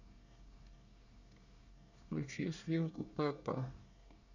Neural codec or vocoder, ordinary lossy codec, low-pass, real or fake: codec, 24 kHz, 1 kbps, SNAC; none; 7.2 kHz; fake